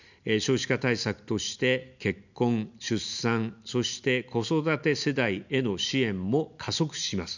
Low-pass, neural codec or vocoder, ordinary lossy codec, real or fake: 7.2 kHz; none; none; real